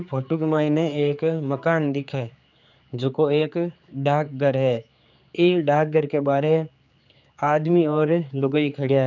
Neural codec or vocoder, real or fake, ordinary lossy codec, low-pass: codec, 16 kHz, 4 kbps, X-Codec, HuBERT features, trained on general audio; fake; none; 7.2 kHz